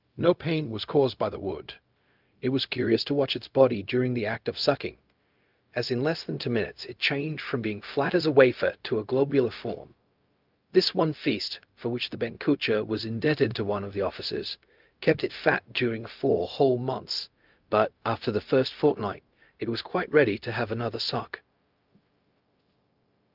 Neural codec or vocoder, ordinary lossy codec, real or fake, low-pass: codec, 16 kHz, 0.4 kbps, LongCat-Audio-Codec; Opus, 24 kbps; fake; 5.4 kHz